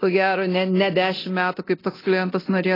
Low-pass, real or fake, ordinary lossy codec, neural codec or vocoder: 5.4 kHz; fake; AAC, 24 kbps; codec, 24 kHz, 0.9 kbps, DualCodec